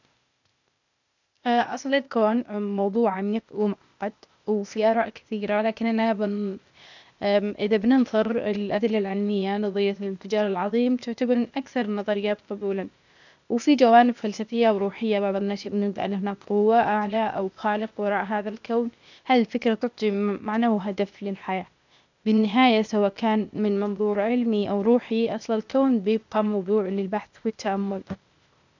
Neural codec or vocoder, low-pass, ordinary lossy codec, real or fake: codec, 16 kHz, 0.8 kbps, ZipCodec; 7.2 kHz; none; fake